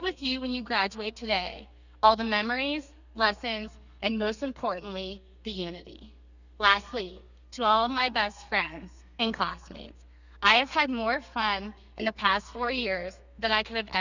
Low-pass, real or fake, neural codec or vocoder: 7.2 kHz; fake; codec, 32 kHz, 1.9 kbps, SNAC